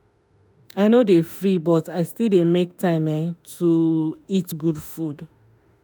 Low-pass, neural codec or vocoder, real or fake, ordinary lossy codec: none; autoencoder, 48 kHz, 32 numbers a frame, DAC-VAE, trained on Japanese speech; fake; none